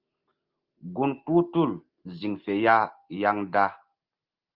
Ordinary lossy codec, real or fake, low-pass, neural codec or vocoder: Opus, 24 kbps; real; 5.4 kHz; none